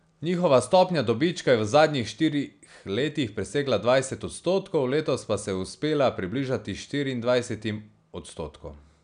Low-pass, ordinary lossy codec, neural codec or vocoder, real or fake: 9.9 kHz; none; none; real